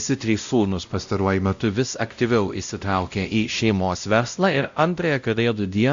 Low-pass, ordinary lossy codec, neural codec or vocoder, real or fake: 7.2 kHz; AAC, 64 kbps; codec, 16 kHz, 0.5 kbps, X-Codec, WavLM features, trained on Multilingual LibriSpeech; fake